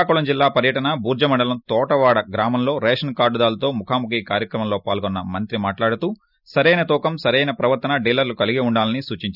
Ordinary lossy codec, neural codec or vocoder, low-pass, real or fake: none; none; 5.4 kHz; real